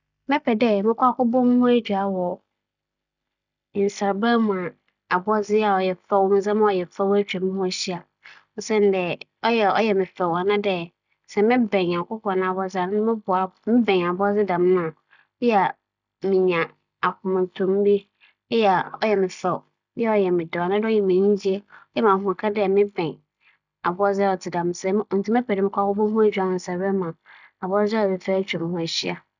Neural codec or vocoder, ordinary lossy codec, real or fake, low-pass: none; none; real; 7.2 kHz